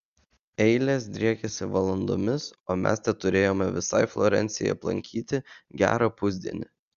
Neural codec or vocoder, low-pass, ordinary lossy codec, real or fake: none; 7.2 kHz; Opus, 64 kbps; real